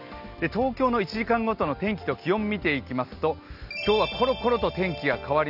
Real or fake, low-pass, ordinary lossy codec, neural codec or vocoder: real; 5.4 kHz; none; none